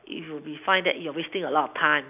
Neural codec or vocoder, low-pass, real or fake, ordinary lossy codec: none; 3.6 kHz; real; none